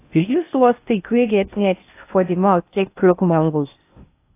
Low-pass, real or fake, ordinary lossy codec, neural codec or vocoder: 3.6 kHz; fake; AAC, 24 kbps; codec, 16 kHz in and 24 kHz out, 0.6 kbps, FocalCodec, streaming, 2048 codes